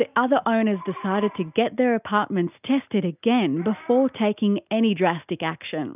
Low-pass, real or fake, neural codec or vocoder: 3.6 kHz; real; none